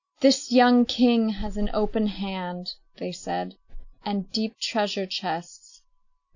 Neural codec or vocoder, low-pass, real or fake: none; 7.2 kHz; real